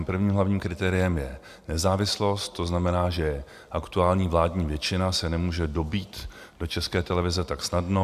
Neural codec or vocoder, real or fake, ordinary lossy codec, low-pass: none; real; AAC, 96 kbps; 14.4 kHz